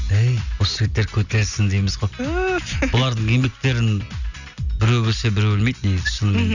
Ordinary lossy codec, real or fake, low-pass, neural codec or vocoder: none; real; 7.2 kHz; none